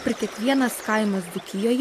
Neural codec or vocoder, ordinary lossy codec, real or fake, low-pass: none; AAC, 64 kbps; real; 14.4 kHz